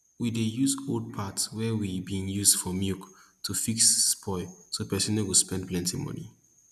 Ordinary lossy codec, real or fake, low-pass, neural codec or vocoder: none; real; 14.4 kHz; none